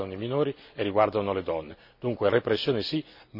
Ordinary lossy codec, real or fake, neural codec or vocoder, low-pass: none; real; none; 5.4 kHz